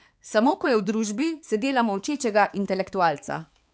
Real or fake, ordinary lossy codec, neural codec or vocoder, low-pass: fake; none; codec, 16 kHz, 4 kbps, X-Codec, HuBERT features, trained on balanced general audio; none